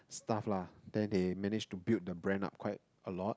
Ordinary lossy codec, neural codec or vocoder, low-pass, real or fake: none; none; none; real